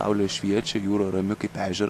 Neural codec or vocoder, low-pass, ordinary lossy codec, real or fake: none; 14.4 kHz; AAC, 64 kbps; real